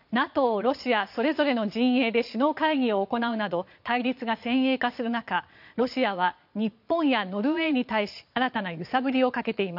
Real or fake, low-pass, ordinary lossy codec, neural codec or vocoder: fake; 5.4 kHz; none; vocoder, 22.05 kHz, 80 mel bands, Vocos